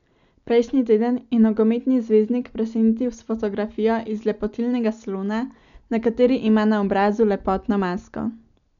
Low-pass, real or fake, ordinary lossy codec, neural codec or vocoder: 7.2 kHz; real; none; none